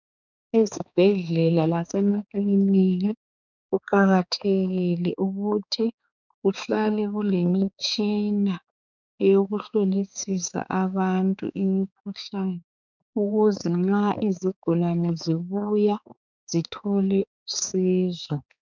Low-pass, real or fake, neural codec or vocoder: 7.2 kHz; fake; codec, 16 kHz, 4 kbps, X-Codec, HuBERT features, trained on balanced general audio